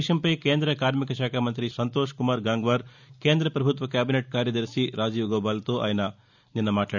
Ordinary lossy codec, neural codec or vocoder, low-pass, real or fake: none; none; 7.2 kHz; real